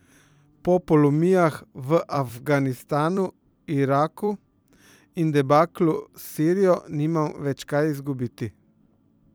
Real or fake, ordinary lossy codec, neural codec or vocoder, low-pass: fake; none; vocoder, 44.1 kHz, 128 mel bands every 256 samples, BigVGAN v2; none